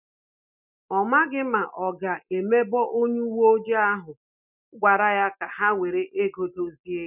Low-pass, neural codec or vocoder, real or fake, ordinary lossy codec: 3.6 kHz; none; real; none